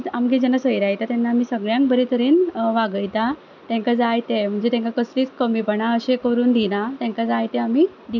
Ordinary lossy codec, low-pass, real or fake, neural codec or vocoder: none; 7.2 kHz; real; none